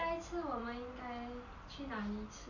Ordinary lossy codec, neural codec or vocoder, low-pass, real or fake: none; none; 7.2 kHz; real